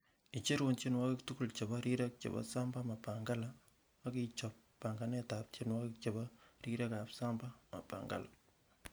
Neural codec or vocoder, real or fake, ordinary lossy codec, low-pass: none; real; none; none